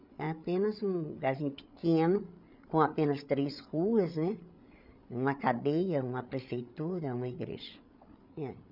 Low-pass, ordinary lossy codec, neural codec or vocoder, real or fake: 5.4 kHz; none; codec, 16 kHz, 8 kbps, FreqCodec, larger model; fake